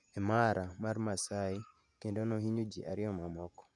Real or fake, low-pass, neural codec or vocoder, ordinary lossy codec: fake; 10.8 kHz; vocoder, 48 kHz, 128 mel bands, Vocos; none